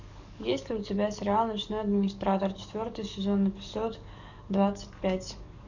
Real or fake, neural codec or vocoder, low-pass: fake; codec, 44.1 kHz, 7.8 kbps, DAC; 7.2 kHz